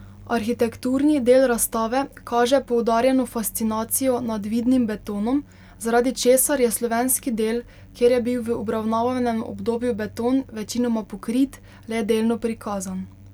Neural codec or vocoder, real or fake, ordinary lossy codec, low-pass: none; real; none; 19.8 kHz